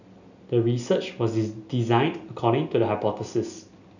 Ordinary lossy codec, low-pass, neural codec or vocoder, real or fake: none; 7.2 kHz; none; real